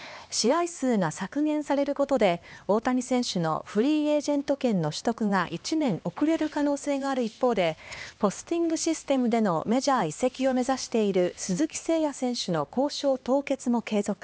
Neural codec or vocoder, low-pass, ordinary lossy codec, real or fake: codec, 16 kHz, 2 kbps, X-Codec, HuBERT features, trained on LibriSpeech; none; none; fake